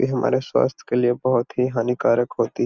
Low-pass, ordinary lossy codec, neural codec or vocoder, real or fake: 7.2 kHz; Opus, 64 kbps; none; real